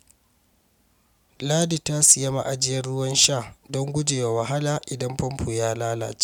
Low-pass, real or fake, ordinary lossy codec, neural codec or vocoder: 19.8 kHz; real; none; none